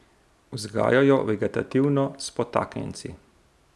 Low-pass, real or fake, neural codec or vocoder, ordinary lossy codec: none; real; none; none